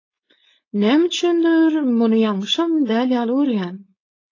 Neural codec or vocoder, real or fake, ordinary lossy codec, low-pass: codec, 16 kHz, 4.8 kbps, FACodec; fake; AAC, 32 kbps; 7.2 kHz